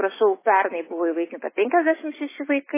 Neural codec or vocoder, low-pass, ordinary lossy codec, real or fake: none; 3.6 kHz; MP3, 16 kbps; real